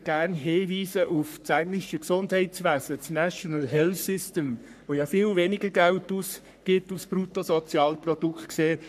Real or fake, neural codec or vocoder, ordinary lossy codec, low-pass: fake; codec, 44.1 kHz, 3.4 kbps, Pupu-Codec; none; 14.4 kHz